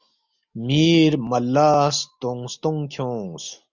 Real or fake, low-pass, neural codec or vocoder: real; 7.2 kHz; none